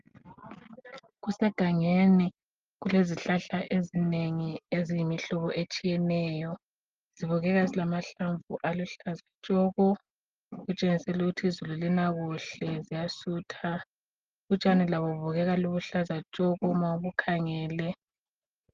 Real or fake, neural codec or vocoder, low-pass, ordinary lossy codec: real; none; 7.2 kHz; Opus, 32 kbps